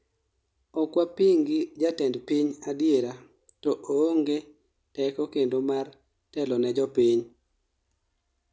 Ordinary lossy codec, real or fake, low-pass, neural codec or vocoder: none; real; none; none